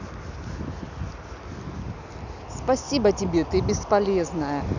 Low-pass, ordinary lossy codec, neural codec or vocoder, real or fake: 7.2 kHz; none; none; real